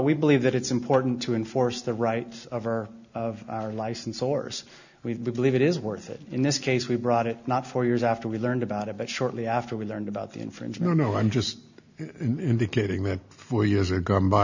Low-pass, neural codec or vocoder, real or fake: 7.2 kHz; none; real